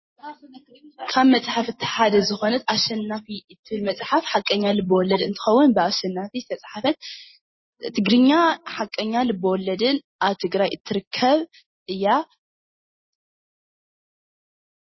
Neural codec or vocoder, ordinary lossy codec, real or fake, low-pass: none; MP3, 24 kbps; real; 7.2 kHz